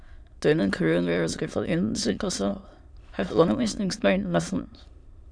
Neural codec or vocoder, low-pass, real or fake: autoencoder, 22.05 kHz, a latent of 192 numbers a frame, VITS, trained on many speakers; 9.9 kHz; fake